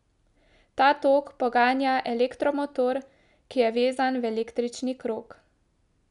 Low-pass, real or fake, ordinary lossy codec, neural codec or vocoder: 10.8 kHz; real; none; none